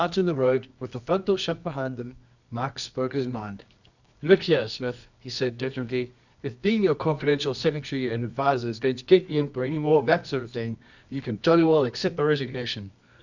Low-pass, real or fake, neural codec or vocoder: 7.2 kHz; fake; codec, 24 kHz, 0.9 kbps, WavTokenizer, medium music audio release